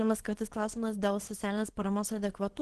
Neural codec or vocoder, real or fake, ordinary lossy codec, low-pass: codec, 24 kHz, 0.9 kbps, WavTokenizer, small release; fake; Opus, 16 kbps; 10.8 kHz